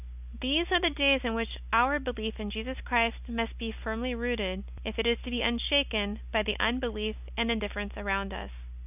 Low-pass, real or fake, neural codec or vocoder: 3.6 kHz; real; none